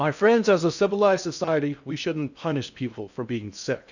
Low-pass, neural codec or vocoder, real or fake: 7.2 kHz; codec, 16 kHz in and 24 kHz out, 0.6 kbps, FocalCodec, streaming, 4096 codes; fake